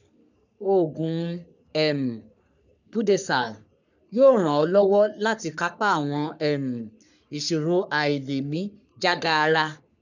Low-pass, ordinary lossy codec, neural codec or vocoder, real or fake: 7.2 kHz; none; codec, 44.1 kHz, 3.4 kbps, Pupu-Codec; fake